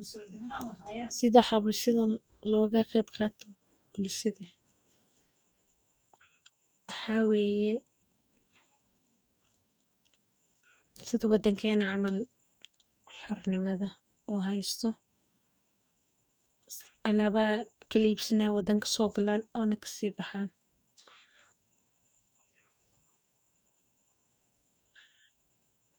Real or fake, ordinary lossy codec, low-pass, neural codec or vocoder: fake; none; none; codec, 44.1 kHz, 2.6 kbps, DAC